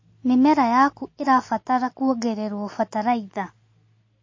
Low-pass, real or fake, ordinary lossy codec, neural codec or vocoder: 7.2 kHz; real; MP3, 32 kbps; none